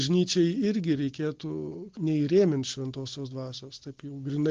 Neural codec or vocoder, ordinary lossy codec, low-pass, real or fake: none; Opus, 16 kbps; 7.2 kHz; real